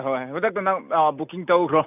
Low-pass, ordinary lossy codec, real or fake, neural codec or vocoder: 3.6 kHz; none; real; none